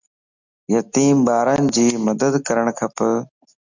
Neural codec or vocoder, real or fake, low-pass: none; real; 7.2 kHz